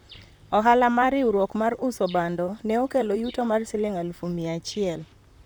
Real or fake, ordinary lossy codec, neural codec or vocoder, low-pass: fake; none; vocoder, 44.1 kHz, 128 mel bands, Pupu-Vocoder; none